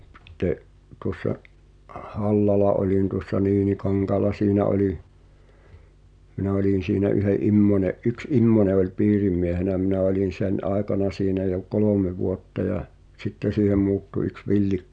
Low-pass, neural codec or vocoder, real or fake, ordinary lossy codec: 9.9 kHz; none; real; none